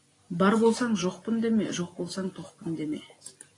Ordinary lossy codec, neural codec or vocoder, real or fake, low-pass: AAC, 32 kbps; none; real; 10.8 kHz